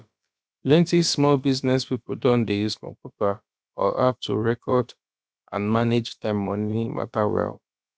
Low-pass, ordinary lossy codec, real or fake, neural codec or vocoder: none; none; fake; codec, 16 kHz, about 1 kbps, DyCAST, with the encoder's durations